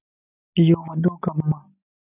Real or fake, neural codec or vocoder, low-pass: real; none; 3.6 kHz